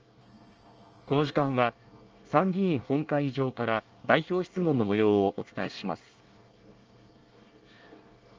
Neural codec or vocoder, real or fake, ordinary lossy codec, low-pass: codec, 24 kHz, 1 kbps, SNAC; fake; Opus, 24 kbps; 7.2 kHz